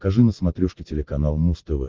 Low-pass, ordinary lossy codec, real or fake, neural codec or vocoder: 7.2 kHz; Opus, 16 kbps; real; none